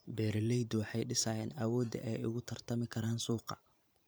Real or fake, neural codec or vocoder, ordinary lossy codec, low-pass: fake; vocoder, 44.1 kHz, 128 mel bands every 512 samples, BigVGAN v2; none; none